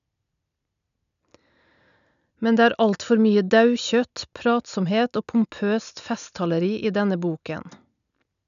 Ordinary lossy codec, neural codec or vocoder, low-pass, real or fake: none; none; 7.2 kHz; real